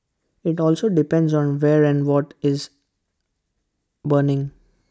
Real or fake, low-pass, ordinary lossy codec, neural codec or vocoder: real; none; none; none